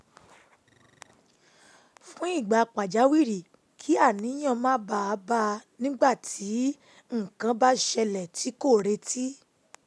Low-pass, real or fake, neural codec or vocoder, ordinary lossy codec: none; real; none; none